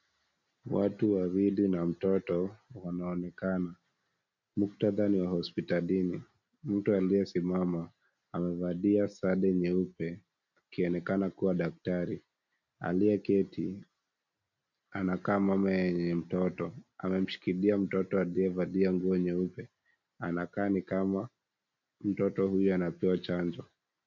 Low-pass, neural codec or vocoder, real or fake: 7.2 kHz; none; real